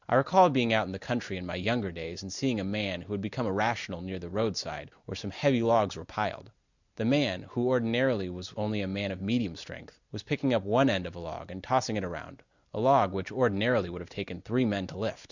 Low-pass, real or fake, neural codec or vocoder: 7.2 kHz; real; none